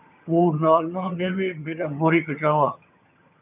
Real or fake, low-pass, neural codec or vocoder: fake; 3.6 kHz; vocoder, 22.05 kHz, 80 mel bands, HiFi-GAN